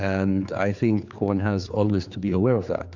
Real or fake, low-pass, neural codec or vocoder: fake; 7.2 kHz; codec, 16 kHz, 4 kbps, X-Codec, HuBERT features, trained on general audio